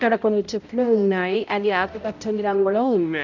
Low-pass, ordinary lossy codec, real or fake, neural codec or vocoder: 7.2 kHz; none; fake; codec, 16 kHz, 0.5 kbps, X-Codec, HuBERT features, trained on balanced general audio